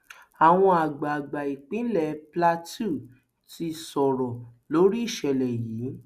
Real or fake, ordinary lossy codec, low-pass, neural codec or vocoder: real; Opus, 64 kbps; 14.4 kHz; none